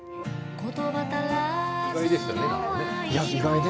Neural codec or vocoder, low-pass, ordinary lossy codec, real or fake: none; none; none; real